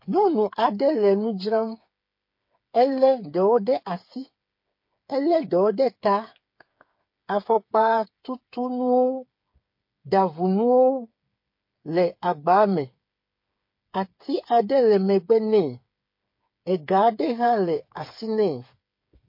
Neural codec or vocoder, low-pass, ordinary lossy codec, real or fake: codec, 16 kHz, 8 kbps, FreqCodec, smaller model; 5.4 kHz; MP3, 32 kbps; fake